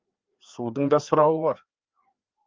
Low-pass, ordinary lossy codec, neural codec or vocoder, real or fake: 7.2 kHz; Opus, 24 kbps; codec, 16 kHz, 2 kbps, FreqCodec, larger model; fake